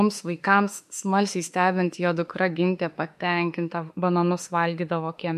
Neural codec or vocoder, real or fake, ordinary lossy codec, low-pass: autoencoder, 48 kHz, 32 numbers a frame, DAC-VAE, trained on Japanese speech; fake; MP3, 64 kbps; 14.4 kHz